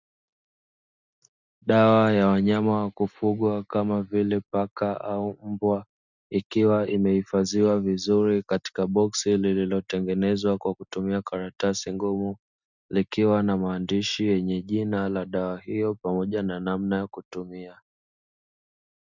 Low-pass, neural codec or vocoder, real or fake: 7.2 kHz; none; real